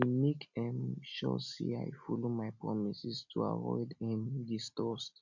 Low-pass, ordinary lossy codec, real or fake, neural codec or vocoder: 7.2 kHz; none; real; none